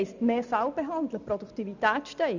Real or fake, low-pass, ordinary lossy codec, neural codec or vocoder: real; 7.2 kHz; none; none